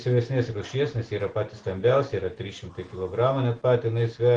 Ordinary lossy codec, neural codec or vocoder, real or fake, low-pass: Opus, 16 kbps; none; real; 9.9 kHz